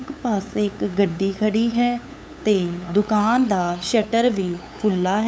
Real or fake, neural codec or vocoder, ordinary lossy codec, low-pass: fake; codec, 16 kHz, 8 kbps, FunCodec, trained on LibriTTS, 25 frames a second; none; none